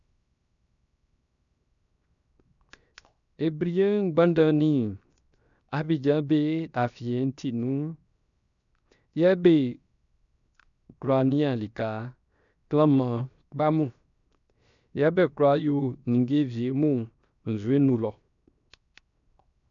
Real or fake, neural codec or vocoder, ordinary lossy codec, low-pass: fake; codec, 16 kHz, 0.7 kbps, FocalCodec; none; 7.2 kHz